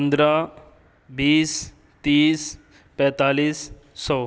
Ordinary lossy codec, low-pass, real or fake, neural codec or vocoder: none; none; real; none